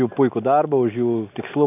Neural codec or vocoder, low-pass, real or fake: none; 3.6 kHz; real